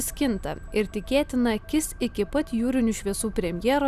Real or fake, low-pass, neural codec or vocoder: real; 14.4 kHz; none